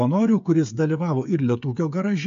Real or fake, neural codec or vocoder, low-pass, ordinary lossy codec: fake; codec, 16 kHz, 8 kbps, FreqCodec, smaller model; 7.2 kHz; MP3, 64 kbps